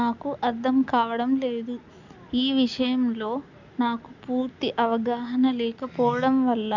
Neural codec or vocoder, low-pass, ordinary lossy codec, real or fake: none; 7.2 kHz; none; real